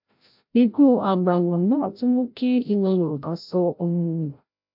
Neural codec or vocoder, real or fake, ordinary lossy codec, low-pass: codec, 16 kHz, 0.5 kbps, FreqCodec, larger model; fake; MP3, 48 kbps; 5.4 kHz